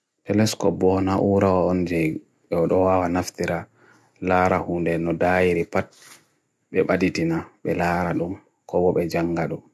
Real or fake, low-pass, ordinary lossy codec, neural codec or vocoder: real; none; none; none